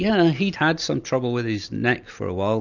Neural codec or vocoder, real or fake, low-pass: none; real; 7.2 kHz